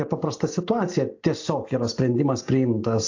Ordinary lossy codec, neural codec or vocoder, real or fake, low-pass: AAC, 48 kbps; none; real; 7.2 kHz